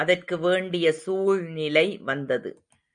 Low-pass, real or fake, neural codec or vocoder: 9.9 kHz; real; none